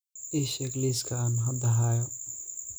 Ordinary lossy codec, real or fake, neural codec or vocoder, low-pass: none; real; none; none